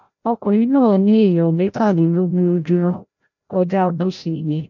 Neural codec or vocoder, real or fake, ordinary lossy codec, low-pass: codec, 16 kHz, 0.5 kbps, FreqCodec, larger model; fake; none; 7.2 kHz